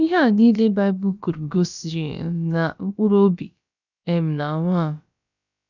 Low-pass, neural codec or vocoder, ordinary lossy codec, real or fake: 7.2 kHz; codec, 16 kHz, about 1 kbps, DyCAST, with the encoder's durations; none; fake